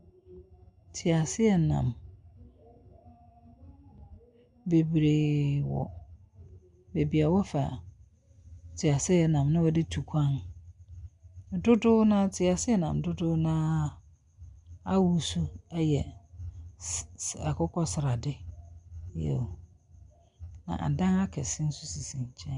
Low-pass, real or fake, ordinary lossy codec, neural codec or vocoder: 10.8 kHz; real; Opus, 64 kbps; none